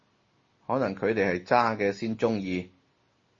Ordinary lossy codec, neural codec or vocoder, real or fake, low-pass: MP3, 32 kbps; none; real; 7.2 kHz